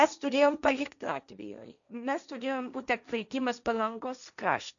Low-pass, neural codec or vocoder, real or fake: 7.2 kHz; codec, 16 kHz, 1.1 kbps, Voila-Tokenizer; fake